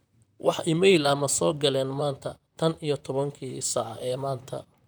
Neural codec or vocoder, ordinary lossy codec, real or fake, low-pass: vocoder, 44.1 kHz, 128 mel bands, Pupu-Vocoder; none; fake; none